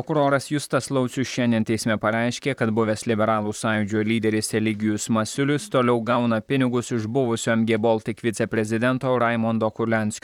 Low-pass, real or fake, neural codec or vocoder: 19.8 kHz; fake; vocoder, 44.1 kHz, 128 mel bands, Pupu-Vocoder